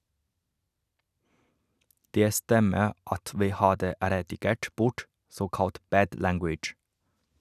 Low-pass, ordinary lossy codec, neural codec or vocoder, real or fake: 14.4 kHz; none; none; real